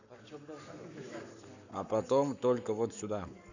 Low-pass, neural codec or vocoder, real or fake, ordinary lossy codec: 7.2 kHz; codec, 44.1 kHz, 7.8 kbps, Pupu-Codec; fake; none